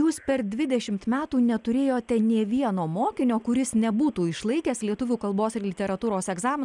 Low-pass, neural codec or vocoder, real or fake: 10.8 kHz; none; real